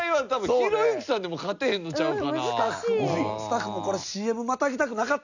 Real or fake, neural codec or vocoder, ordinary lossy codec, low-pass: real; none; none; 7.2 kHz